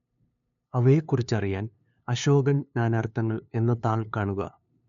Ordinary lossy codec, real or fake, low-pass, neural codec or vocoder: none; fake; 7.2 kHz; codec, 16 kHz, 2 kbps, FunCodec, trained on LibriTTS, 25 frames a second